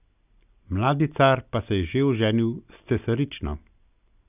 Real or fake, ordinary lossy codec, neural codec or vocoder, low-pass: real; none; none; 3.6 kHz